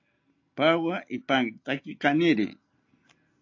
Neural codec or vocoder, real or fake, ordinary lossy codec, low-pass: none; real; AAC, 48 kbps; 7.2 kHz